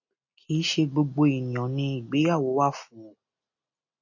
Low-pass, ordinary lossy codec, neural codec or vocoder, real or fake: 7.2 kHz; MP3, 32 kbps; none; real